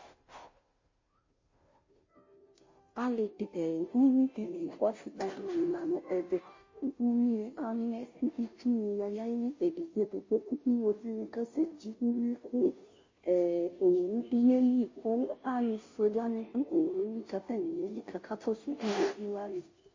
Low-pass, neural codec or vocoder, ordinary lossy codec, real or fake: 7.2 kHz; codec, 16 kHz, 0.5 kbps, FunCodec, trained on Chinese and English, 25 frames a second; MP3, 32 kbps; fake